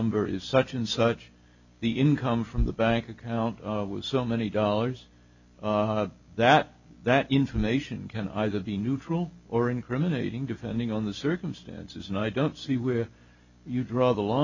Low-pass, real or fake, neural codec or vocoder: 7.2 kHz; real; none